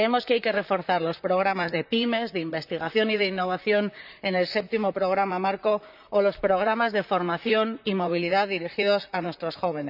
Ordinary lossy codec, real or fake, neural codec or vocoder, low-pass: none; fake; vocoder, 44.1 kHz, 128 mel bands, Pupu-Vocoder; 5.4 kHz